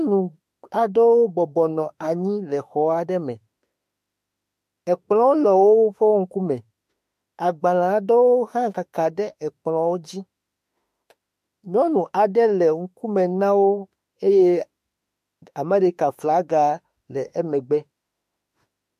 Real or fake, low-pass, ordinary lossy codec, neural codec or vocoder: fake; 14.4 kHz; MP3, 64 kbps; autoencoder, 48 kHz, 32 numbers a frame, DAC-VAE, trained on Japanese speech